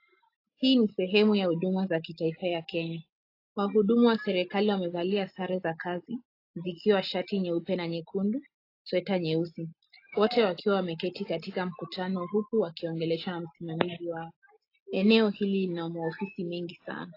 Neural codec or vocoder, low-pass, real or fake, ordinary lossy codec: none; 5.4 kHz; real; AAC, 32 kbps